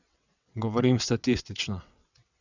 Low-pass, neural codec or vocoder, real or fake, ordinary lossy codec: 7.2 kHz; vocoder, 22.05 kHz, 80 mel bands, WaveNeXt; fake; none